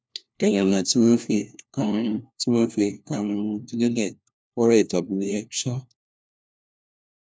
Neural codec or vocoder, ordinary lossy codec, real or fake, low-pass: codec, 16 kHz, 1 kbps, FunCodec, trained on LibriTTS, 50 frames a second; none; fake; none